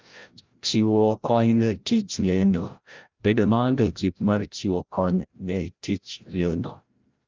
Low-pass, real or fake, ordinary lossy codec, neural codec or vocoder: 7.2 kHz; fake; Opus, 24 kbps; codec, 16 kHz, 0.5 kbps, FreqCodec, larger model